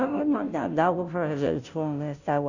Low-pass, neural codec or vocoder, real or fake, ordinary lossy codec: 7.2 kHz; codec, 16 kHz, 0.5 kbps, FunCodec, trained on Chinese and English, 25 frames a second; fake; none